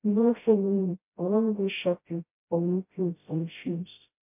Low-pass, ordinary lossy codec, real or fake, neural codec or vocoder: 3.6 kHz; MP3, 32 kbps; fake; codec, 16 kHz, 0.5 kbps, FreqCodec, smaller model